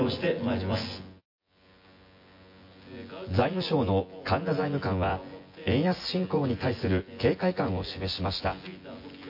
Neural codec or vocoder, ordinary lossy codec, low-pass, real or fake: vocoder, 24 kHz, 100 mel bands, Vocos; MP3, 32 kbps; 5.4 kHz; fake